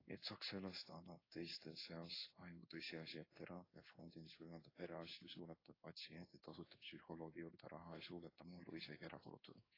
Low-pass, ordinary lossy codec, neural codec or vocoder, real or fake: 5.4 kHz; AAC, 24 kbps; codec, 16 kHz in and 24 kHz out, 1 kbps, XY-Tokenizer; fake